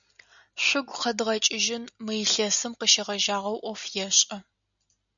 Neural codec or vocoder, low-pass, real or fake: none; 7.2 kHz; real